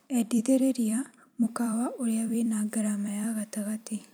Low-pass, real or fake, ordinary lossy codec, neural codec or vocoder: none; fake; none; vocoder, 44.1 kHz, 128 mel bands every 256 samples, BigVGAN v2